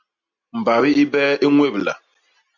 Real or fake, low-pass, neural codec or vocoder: real; 7.2 kHz; none